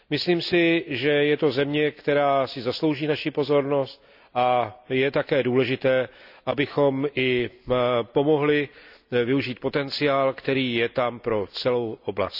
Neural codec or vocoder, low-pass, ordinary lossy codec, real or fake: none; 5.4 kHz; none; real